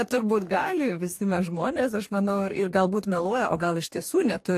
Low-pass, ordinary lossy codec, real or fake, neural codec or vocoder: 14.4 kHz; AAC, 64 kbps; fake; codec, 44.1 kHz, 2.6 kbps, DAC